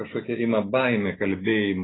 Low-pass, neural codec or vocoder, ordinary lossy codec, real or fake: 7.2 kHz; none; AAC, 16 kbps; real